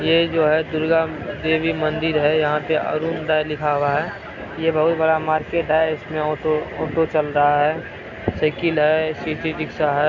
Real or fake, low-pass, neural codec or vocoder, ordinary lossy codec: real; 7.2 kHz; none; none